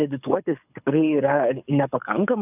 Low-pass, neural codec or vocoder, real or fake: 3.6 kHz; codec, 24 kHz, 6 kbps, HILCodec; fake